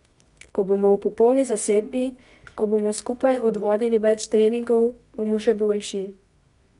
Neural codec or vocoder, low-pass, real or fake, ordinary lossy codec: codec, 24 kHz, 0.9 kbps, WavTokenizer, medium music audio release; 10.8 kHz; fake; none